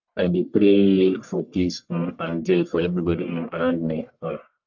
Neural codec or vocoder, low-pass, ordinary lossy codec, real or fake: codec, 44.1 kHz, 1.7 kbps, Pupu-Codec; 7.2 kHz; none; fake